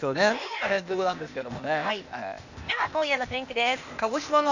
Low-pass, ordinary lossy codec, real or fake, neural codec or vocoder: 7.2 kHz; none; fake; codec, 16 kHz, 0.8 kbps, ZipCodec